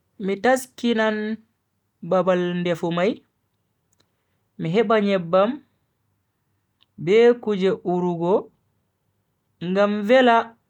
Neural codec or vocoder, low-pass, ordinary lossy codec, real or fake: none; 19.8 kHz; none; real